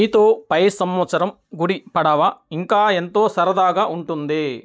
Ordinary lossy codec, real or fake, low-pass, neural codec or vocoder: none; real; none; none